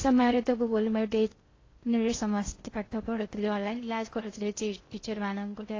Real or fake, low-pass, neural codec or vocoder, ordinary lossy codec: fake; 7.2 kHz; codec, 16 kHz in and 24 kHz out, 0.6 kbps, FocalCodec, streaming, 2048 codes; AAC, 32 kbps